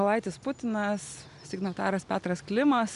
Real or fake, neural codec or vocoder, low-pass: real; none; 10.8 kHz